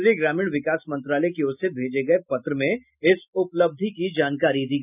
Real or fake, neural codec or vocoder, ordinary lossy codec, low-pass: real; none; none; 3.6 kHz